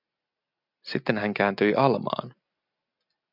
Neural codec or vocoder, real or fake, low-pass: none; real; 5.4 kHz